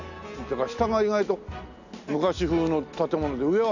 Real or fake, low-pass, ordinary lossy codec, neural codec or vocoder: real; 7.2 kHz; none; none